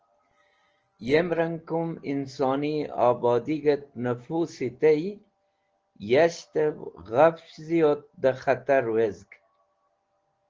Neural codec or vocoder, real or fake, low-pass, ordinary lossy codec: none; real; 7.2 kHz; Opus, 16 kbps